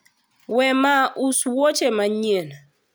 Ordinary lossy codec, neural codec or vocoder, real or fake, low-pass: none; none; real; none